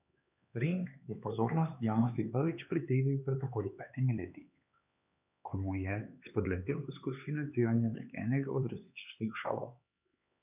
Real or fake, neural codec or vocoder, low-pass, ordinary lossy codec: fake; codec, 16 kHz, 4 kbps, X-Codec, HuBERT features, trained on LibriSpeech; 3.6 kHz; none